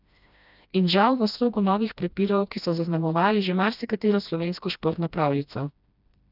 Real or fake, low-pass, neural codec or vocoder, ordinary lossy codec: fake; 5.4 kHz; codec, 16 kHz, 1 kbps, FreqCodec, smaller model; none